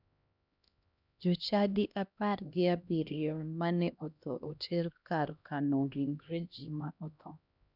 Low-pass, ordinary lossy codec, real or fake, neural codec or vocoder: 5.4 kHz; none; fake; codec, 16 kHz, 1 kbps, X-Codec, HuBERT features, trained on LibriSpeech